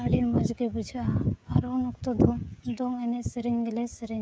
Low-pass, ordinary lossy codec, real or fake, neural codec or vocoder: none; none; fake; codec, 16 kHz, 16 kbps, FreqCodec, smaller model